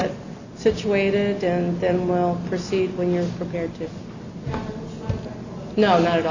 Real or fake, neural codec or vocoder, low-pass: real; none; 7.2 kHz